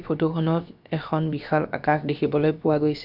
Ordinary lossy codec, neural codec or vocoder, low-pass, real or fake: none; codec, 16 kHz, about 1 kbps, DyCAST, with the encoder's durations; 5.4 kHz; fake